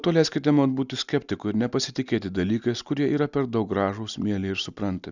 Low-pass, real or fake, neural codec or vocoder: 7.2 kHz; real; none